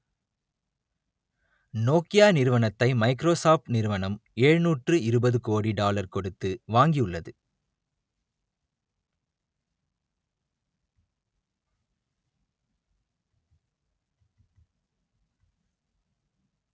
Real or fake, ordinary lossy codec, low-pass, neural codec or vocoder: real; none; none; none